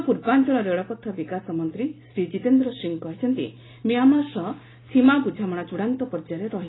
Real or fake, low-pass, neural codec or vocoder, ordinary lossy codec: real; 7.2 kHz; none; AAC, 16 kbps